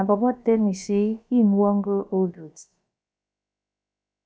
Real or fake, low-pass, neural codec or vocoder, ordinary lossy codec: fake; none; codec, 16 kHz, about 1 kbps, DyCAST, with the encoder's durations; none